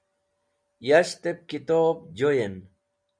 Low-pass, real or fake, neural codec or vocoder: 9.9 kHz; real; none